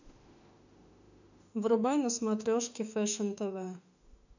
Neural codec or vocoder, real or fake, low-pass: autoencoder, 48 kHz, 32 numbers a frame, DAC-VAE, trained on Japanese speech; fake; 7.2 kHz